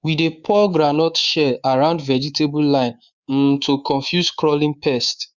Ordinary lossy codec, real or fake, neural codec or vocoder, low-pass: Opus, 64 kbps; fake; codec, 24 kHz, 3.1 kbps, DualCodec; 7.2 kHz